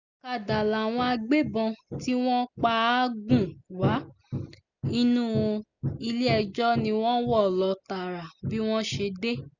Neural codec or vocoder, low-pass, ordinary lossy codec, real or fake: none; 7.2 kHz; none; real